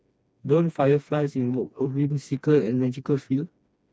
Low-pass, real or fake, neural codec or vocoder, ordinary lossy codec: none; fake; codec, 16 kHz, 2 kbps, FreqCodec, smaller model; none